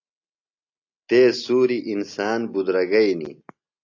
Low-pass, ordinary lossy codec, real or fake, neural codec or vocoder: 7.2 kHz; AAC, 48 kbps; real; none